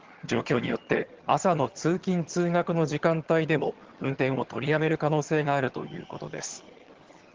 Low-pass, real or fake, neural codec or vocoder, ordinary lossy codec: 7.2 kHz; fake; vocoder, 22.05 kHz, 80 mel bands, HiFi-GAN; Opus, 16 kbps